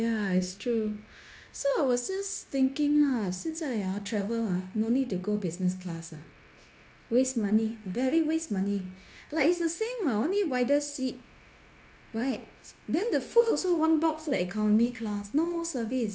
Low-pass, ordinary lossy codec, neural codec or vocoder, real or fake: none; none; codec, 16 kHz, 0.9 kbps, LongCat-Audio-Codec; fake